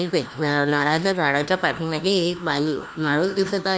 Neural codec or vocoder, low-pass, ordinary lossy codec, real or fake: codec, 16 kHz, 1 kbps, FunCodec, trained on LibriTTS, 50 frames a second; none; none; fake